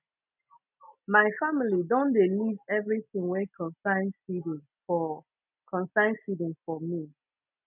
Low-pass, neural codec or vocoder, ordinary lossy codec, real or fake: 3.6 kHz; none; none; real